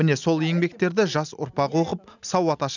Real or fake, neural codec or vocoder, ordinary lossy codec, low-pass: real; none; none; 7.2 kHz